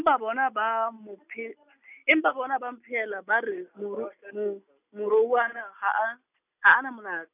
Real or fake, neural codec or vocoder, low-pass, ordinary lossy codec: real; none; 3.6 kHz; none